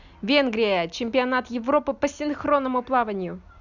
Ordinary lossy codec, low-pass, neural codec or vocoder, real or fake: none; 7.2 kHz; none; real